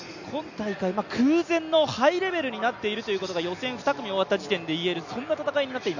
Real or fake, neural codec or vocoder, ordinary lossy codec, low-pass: real; none; none; 7.2 kHz